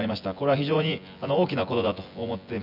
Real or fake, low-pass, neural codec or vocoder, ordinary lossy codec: fake; 5.4 kHz; vocoder, 24 kHz, 100 mel bands, Vocos; none